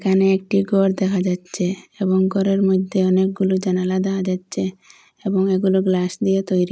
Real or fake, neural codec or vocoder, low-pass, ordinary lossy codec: real; none; none; none